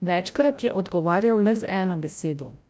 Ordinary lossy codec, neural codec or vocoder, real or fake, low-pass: none; codec, 16 kHz, 0.5 kbps, FreqCodec, larger model; fake; none